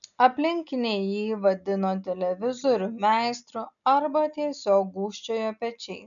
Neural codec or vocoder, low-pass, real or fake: none; 7.2 kHz; real